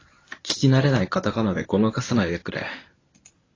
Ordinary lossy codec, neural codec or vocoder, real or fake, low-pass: AAC, 32 kbps; codec, 24 kHz, 0.9 kbps, WavTokenizer, medium speech release version 1; fake; 7.2 kHz